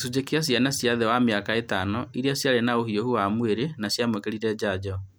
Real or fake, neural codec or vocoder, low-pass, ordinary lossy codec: real; none; none; none